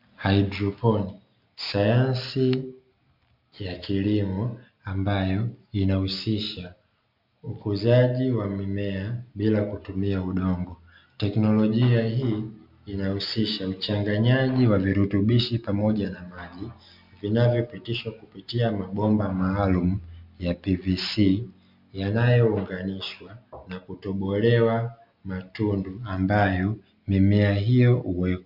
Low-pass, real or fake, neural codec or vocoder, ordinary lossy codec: 5.4 kHz; real; none; MP3, 48 kbps